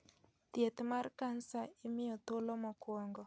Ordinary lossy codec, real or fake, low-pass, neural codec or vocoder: none; real; none; none